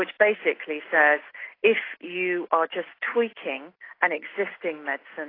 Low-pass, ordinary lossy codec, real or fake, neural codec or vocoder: 5.4 kHz; AAC, 24 kbps; real; none